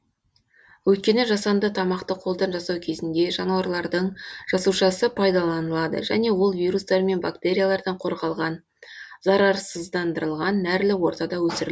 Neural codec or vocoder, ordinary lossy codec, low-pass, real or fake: none; none; none; real